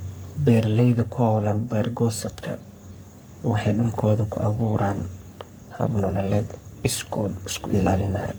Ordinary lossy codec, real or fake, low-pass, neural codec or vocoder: none; fake; none; codec, 44.1 kHz, 3.4 kbps, Pupu-Codec